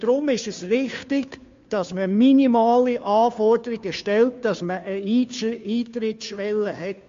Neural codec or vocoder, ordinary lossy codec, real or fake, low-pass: codec, 16 kHz, 2 kbps, FunCodec, trained on Chinese and English, 25 frames a second; MP3, 48 kbps; fake; 7.2 kHz